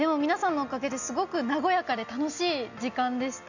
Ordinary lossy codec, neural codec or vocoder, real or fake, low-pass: none; none; real; 7.2 kHz